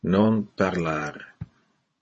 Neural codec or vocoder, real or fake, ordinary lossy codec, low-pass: vocoder, 48 kHz, 128 mel bands, Vocos; fake; MP3, 32 kbps; 10.8 kHz